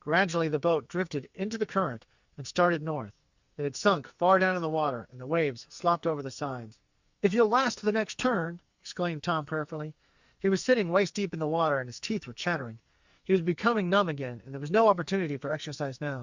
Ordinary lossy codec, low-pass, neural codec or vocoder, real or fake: Opus, 64 kbps; 7.2 kHz; codec, 44.1 kHz, 2.6 kbps, SNAC; fake